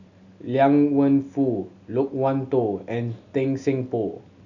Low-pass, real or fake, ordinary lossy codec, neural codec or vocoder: 7.2 kHz; real; none; none